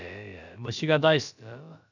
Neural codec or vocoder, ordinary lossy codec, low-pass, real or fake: codec, 16 kHz, about 1 kbps, DyCAST, with the encoder's durations; none; 7.2 kHz; fake